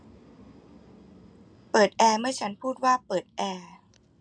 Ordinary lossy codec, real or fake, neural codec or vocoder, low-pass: none; real; none; 9.9 kHz